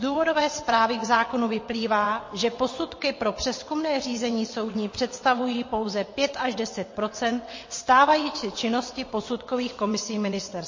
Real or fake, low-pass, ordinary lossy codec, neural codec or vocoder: fake; 7.2 kHz; MP3, 32 kbps; vocoder, 22.05 kHz, 80 mel bands, WaveNeXt